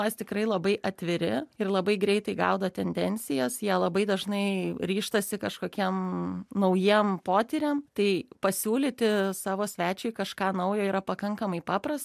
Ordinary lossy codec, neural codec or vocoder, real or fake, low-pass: MP3, 96 kbps; none; real; 14.4 kHz